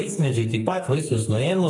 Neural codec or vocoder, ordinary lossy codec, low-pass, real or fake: codec, 32 kHz, 1.9 kbps, SNAC; AAC, 32 kbps; 10.8 kHz; fake